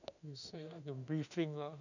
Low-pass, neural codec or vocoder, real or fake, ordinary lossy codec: 7.2 kHz; autoencoder, 48 kHz, 32 numbers a frame, DAC-VAE, trained on Japanese speech; fake; none